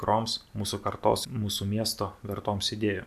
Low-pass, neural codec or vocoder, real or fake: 14.4 kHz; none; real